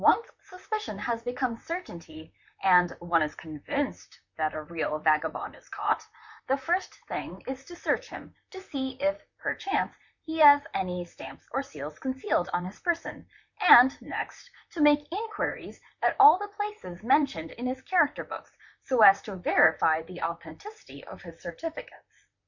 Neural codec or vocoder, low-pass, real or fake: vocoder, 44.1 kHz, 128 mel bands, Pupu-Vocoder; 7.2 kHz; fake